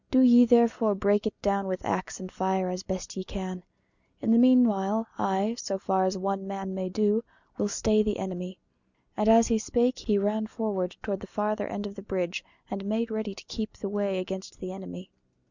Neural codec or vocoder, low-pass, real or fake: none; 7.2 kHz; real